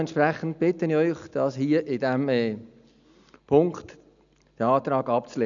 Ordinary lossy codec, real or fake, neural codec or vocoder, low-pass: none; real; none; 7.2 kHz